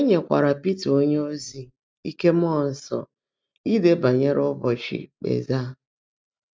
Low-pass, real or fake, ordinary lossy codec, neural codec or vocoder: none; real; none; none